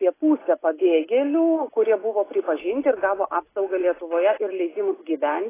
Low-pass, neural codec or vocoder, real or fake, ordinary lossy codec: 3.6 kHz; none; real; AAC, 16 kbps